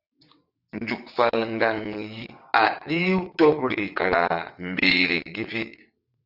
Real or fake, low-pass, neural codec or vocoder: fake; 5.4 kHz; vocoder, 22.05 kHz, 80 mel bands, WaveNeXt